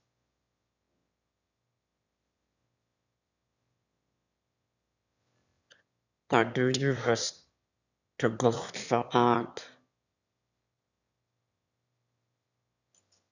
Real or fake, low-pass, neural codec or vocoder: fake; 7.2 kHz; autoencoder, 22.05 kHz, a latent of 192 numbers a frame, VITS, trained on one speaker